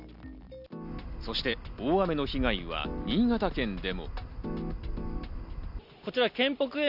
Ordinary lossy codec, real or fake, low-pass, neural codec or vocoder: none; real; 5.4 kHz; none